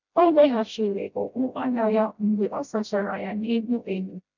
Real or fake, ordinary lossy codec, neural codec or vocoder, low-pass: fake; none; codec, 16 kHz, 0.5 kbps, FreqCodec, smaller model; 7.2 kHz